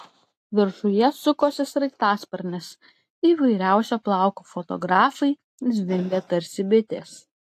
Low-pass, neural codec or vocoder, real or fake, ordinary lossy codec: 14.4 kHz; none; real; AAC, 64 kbps